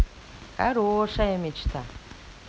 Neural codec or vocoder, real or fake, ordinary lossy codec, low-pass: none; real; none; none